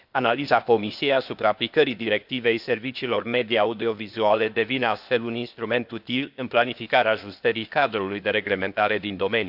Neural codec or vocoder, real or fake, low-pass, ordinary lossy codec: codec, 16 kHz, 0.8 kbps, ZipCodec; fake; 5.4 kHz; none